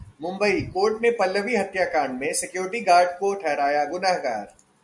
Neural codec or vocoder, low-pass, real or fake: none; 10.8 kHz; real